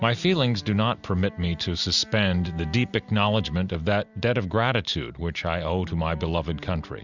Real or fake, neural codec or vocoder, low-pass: real; none; 7.2 kHz